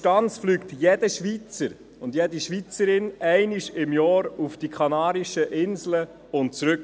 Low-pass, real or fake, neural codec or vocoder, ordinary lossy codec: none; real; none; none